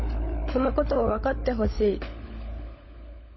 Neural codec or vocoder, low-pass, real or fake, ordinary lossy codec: codec, 16 kHz, 16 kbps, FunCodec, trained on LibriTTS, 50 frames a second; 7.2 kHz; fake; MP3, 24 kbps